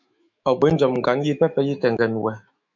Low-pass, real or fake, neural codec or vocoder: 7.2 kHz; fake; autoencoder, 48 kHz, 128 numbers a frame, DAC-VAE, trained on Japanese speech